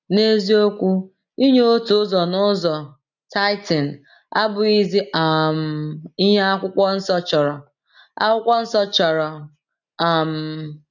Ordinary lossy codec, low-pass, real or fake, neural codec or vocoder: none; 7.2 kHz; real; none